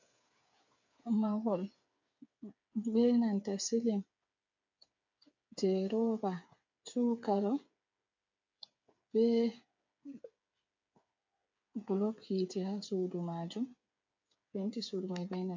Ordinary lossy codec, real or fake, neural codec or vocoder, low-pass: MP3, 48 kbps; fake; codec, 16 kHz, 8 kbps, FreqCodec, smaller model; 7.2 kHz